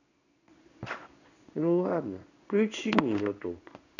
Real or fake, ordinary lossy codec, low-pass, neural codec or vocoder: fake; none; 7.2 kHz; codec, 16 kHz in and 24 kHz out, 1 kbps, XY-Tokenizer